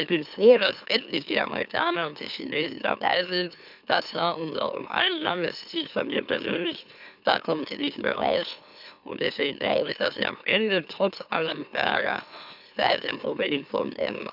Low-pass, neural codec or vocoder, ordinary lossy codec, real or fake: 5.4 kHz; autoencoder, 44.1 kHz, a latent of 192 numbers a frame, MeloTTS; none; fake